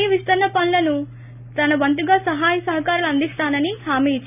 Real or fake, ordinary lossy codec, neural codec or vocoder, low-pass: real; none; none; 3.6 kHz